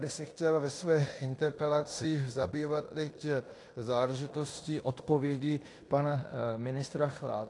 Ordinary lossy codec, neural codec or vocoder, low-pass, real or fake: AAC, 48 kbps; codec, 16 kHz in and 24 kHz out, 0.9 kbps, LongCat-Audio-Codec, fine tuned four codebook decoder; 10.8 kHz; fake